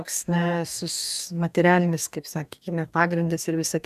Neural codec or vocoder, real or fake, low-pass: codec, 44.1 kHz, 2.6 kbps, DAC; fake; 14.4 kHz